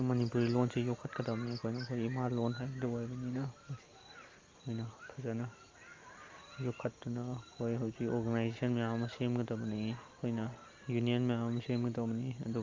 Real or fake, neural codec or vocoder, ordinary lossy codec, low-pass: real; none; Opus, 32 kbps; 7.2 kHz